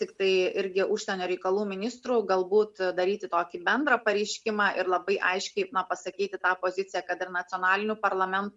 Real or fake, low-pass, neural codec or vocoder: real; 10.8 kHz; none